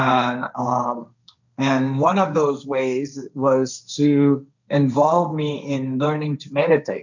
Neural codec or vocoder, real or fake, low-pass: codec, 16 kHz, 1.1 kbps, Voila-Tokenizer; fake; 7.2 kHz